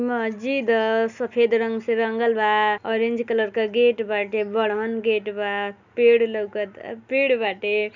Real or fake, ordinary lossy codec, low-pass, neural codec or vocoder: real; none; 7.2 kHz; none